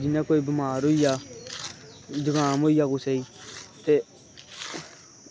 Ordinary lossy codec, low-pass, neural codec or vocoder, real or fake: none; none; none; real